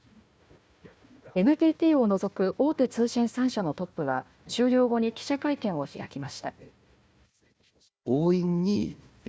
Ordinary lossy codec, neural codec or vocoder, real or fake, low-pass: none; codec, 16 kHz, 1 kbps, FunCodec, trained on Chinese and English, 50 frames a second; fake; none